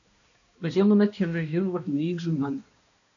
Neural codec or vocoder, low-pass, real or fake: codec, 16 kHz, 1 kbps, X-Codec, HuBERT features, trained on balanced general audio; 7.2 kHz; fake